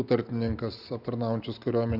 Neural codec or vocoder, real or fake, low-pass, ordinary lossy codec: none; real; 5.4 kHz; Opus, 64 kbps